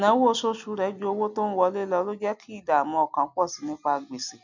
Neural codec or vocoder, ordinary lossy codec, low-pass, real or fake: none; none; 7.2 kHz; real